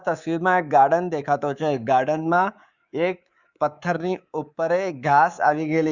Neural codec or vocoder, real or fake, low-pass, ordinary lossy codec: codec, 24 kHz, 3.1 kbps, DualCodec; fake; 7.2 kHz; Opus, 64 kbps